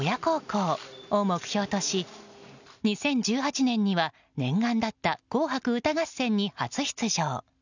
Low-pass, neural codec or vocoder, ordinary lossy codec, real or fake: 7.2 kHz; none; none; real